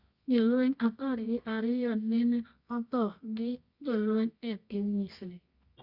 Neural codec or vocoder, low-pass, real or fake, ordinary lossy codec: codec, 24 kHz, 0.9 kbps, WavTokenizer, medium music audio release; 5.4 kHz; fake; Opus, 64 kbps